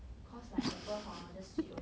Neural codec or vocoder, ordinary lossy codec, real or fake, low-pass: none; none; real; none